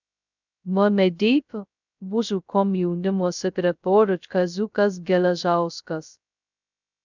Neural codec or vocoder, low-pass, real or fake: codec, 16 kHz, 0.2 kbps, FocalCodec; 7.2 kHz; fake